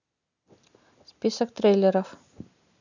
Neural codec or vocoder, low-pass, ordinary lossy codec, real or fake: none; 7.2 kHz; none; real